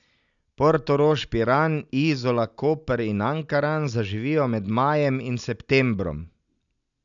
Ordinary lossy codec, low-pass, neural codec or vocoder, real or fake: MP3, 96 kbps; 7.2 kHz; none; real